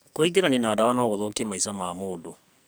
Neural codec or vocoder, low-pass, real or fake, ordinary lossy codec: codec, 44.1 kHz, 2.6 kbps, SNAC; none; fake; none